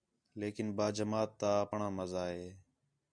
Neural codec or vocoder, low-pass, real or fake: none; 9.9 kHz; real